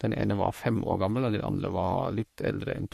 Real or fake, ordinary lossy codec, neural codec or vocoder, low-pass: fake; MP3, 64 kbps; codec, 44.1 kHz, 3.4 kbps, Pupu-Codec; 14.4 kHz